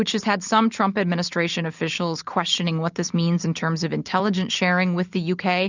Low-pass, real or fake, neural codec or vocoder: 7.2 kHz; real; none